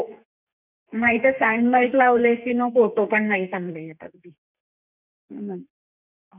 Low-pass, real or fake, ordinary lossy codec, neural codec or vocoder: 3.6 kHz; fake; none; codec, 32 kHz, 1.9 kbps, SNAC